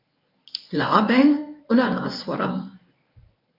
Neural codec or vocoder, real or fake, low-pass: codec, 24 kHz, 0.9 kbps, WavTokenizer, medium speech release version 1; fake; 5.4 kHz